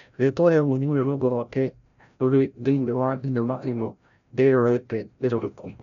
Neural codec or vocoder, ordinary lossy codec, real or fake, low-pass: codec, 16 kHz, 0.5 kbps, FreqCodec, larger model; none; fake; 7.2 kHz